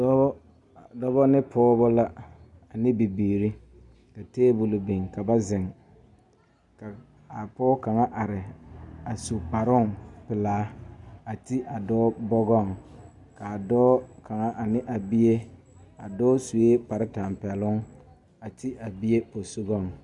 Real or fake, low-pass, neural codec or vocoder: real; 10.8 kHz; none